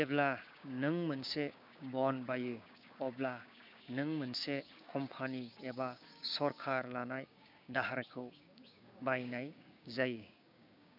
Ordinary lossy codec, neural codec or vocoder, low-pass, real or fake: none; codec, 16 kHz, 6 kbps, DAC; 5.4 kHz; fake